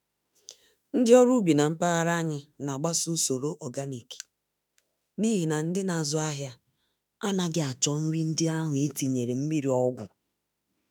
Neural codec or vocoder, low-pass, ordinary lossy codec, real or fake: autoencoder, 48 kHz, 32 numbers a frame, DAC-VAE, trained on Japanese speech; none; none; fake